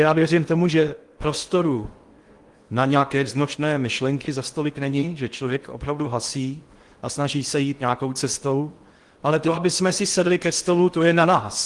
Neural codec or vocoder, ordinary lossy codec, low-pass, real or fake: codec, 16 kHz in and 24 kHz out, 0.8 kbps, FocalCodec, streaming, 65536 codes; Opus, 24 kbps; 10.8 kHz; fake